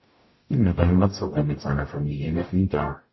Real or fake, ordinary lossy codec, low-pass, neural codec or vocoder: fake; MP3, 24 kbps; 7.2 kHz; codec, 44.1 kHz, 0.9 kbps, DAC